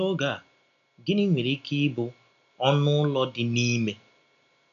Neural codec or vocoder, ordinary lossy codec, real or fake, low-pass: none; none; real; 7.2 kHz